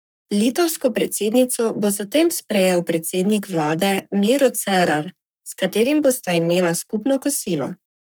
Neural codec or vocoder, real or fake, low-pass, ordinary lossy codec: codec, 44.1 kHz, 3.4 kbps, Pupu-Codec; fake; none; none